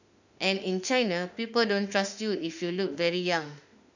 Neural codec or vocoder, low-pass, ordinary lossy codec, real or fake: autoencoder, 48 kHz, 32 numbers a frame, DAC-VAE, trained on Japanese speech; 7.2 kHz; none; fake